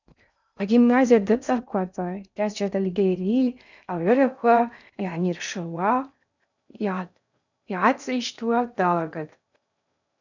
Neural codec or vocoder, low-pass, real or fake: codec, 16 kHz in and 24 kHz out, 0.6 kbps, FocalCodec, streaming, 2048 codes; 7.2 kHz; fake